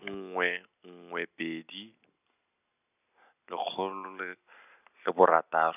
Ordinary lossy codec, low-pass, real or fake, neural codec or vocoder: none; 3.6 kHz; real; none